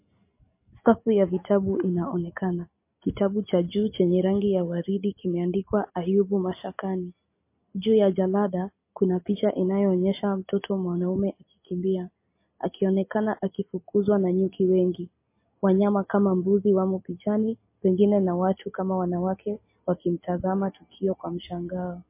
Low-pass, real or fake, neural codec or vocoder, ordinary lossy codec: 3.6 kHz; real; none; MP3, 24 kbps